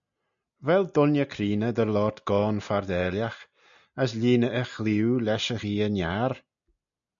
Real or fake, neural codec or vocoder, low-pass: real; none; 7.2 kHz